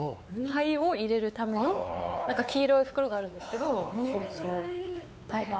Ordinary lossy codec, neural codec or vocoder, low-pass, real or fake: none; codec, 16 kHz, 4 kbps, X-Codec, WavLM features, trained on Multilingual LibriSpeech; none; fake